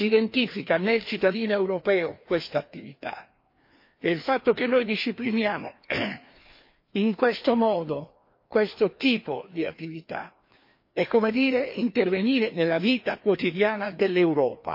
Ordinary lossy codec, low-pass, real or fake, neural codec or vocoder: MP3, 32 kbps; 5.4 kHz; fake; codec, 16 kHz, 2 kbps, FreqCodec, larger model